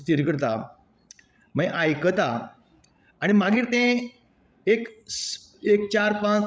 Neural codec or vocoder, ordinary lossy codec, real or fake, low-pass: codec, 16 kHz, 16 kbps, FreqCodec, larger model; none; fake; none